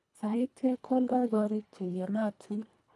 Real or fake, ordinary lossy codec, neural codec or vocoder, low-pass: fake; none; codec, 24 kHz, 1.5 kbps, HILCodec; none